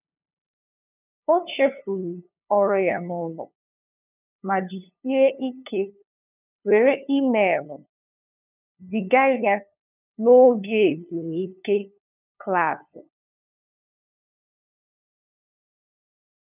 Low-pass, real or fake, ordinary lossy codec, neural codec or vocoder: 3.6 kHz; fake; none; codec, 16 kHz, 2 kbps, FunCodec, trained on LibriTTS, 25 frames a second